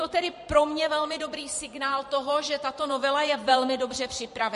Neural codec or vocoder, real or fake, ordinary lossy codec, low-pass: vocoder, 44.1 kHz, 128 mel bands every 512 samples, BigVGAN v2; fake; MP3, 48 kbps; 14.4 kHz